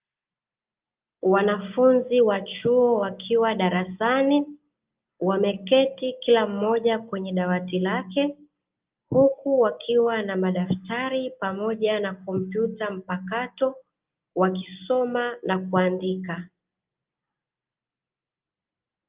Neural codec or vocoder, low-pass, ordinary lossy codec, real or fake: none; 3.6 kHz; Opus, 24 kbps; real